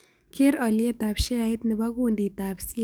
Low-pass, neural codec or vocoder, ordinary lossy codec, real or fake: none; codec, 44.1 kHz, 7.8 kbps, DAC; none; fake